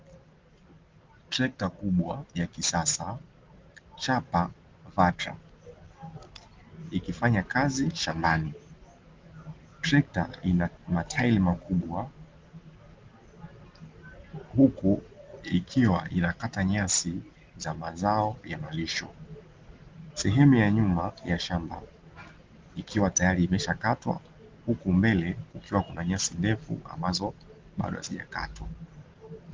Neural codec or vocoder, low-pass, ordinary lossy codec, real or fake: none; 7.2 kHz; Opus, 16 kbps; real